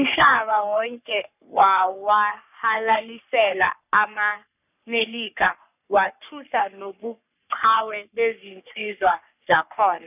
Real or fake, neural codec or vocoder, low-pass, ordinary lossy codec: fake; codec, 44.1 kHz, 3.4 kbps, Pupu-Codec; 3.6 kHz; none